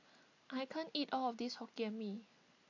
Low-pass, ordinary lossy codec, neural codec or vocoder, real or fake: 7.2 kHz; AAC, 48 kbps; none; real